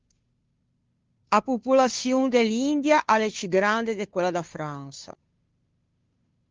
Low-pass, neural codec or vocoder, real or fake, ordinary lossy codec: 7.2 kHz; codec, 16 kHz, 2 kbps, FunCodec, trained on Chinese and English, 25 frames a second; fake; Opus, 32 kbps